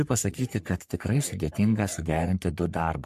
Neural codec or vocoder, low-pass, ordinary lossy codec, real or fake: codec, 44.1 kHz, 3.4 kbps, Pupu-Codec; 14.4 kHz; MP3, 64 kbps; fake